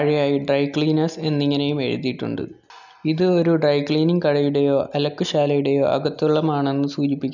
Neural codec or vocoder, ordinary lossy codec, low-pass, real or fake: none; none; 7.2 kHz; real